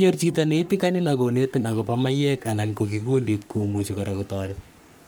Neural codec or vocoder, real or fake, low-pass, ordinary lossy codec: codec, 44.1 kHz, 3.4 kbps, Pupu-Codec; fake; none; none